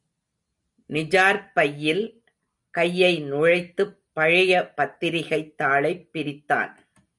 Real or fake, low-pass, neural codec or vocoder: real; 10.8 kHz; none